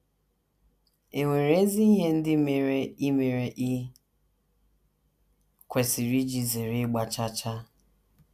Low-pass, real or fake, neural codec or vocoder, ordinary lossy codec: 14.4 kHz; real; none; none